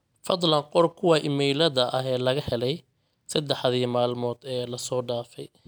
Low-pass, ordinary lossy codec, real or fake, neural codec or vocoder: none; none; real; none